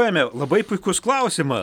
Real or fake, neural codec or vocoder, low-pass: real; none; 19.8 kHz